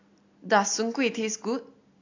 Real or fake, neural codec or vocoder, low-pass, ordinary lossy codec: real; none; 7.2 kHz; AAC, 48 kbps